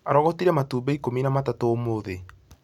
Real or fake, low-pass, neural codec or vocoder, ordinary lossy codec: real; 19.8 kHz; none; none